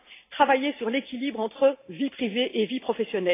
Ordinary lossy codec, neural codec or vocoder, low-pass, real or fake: AAC, 24 kbps; none; 3.6 kHz; real